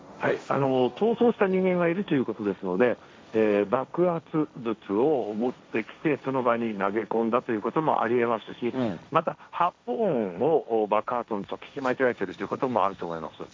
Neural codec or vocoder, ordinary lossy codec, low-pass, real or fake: codec, 16 kHz, 1.1 kbps, Voila-Tokenizer; none; none; fake